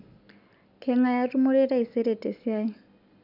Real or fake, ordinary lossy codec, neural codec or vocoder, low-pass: real; none; none; 5.4 kHz